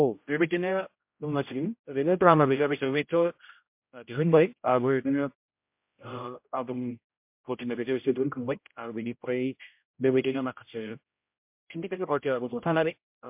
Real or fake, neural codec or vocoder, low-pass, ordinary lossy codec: fake; codec, 16 kHz, 0.5 kbps, X-Codec, HuBERT features, trained on general audio; 3.6 kHz; MP3, 32 kbps